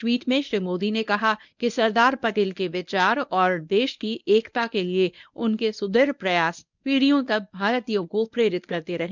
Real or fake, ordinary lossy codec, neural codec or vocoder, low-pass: fake; none; codec, 24 kHz, 0.9 kbps, WavTokenizer, medium speech release version 1; 7.2 kHz